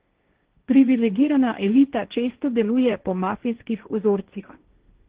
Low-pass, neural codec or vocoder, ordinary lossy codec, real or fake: 3.6 kHz; codec, 16 kHz, 1.1 kbps, Voila-Tokenizer; Opus, 16 kbps; fake